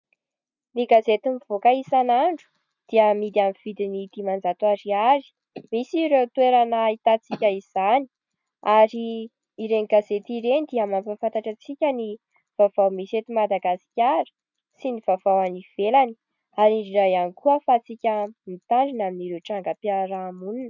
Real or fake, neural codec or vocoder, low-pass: real; none; 7.2 kHz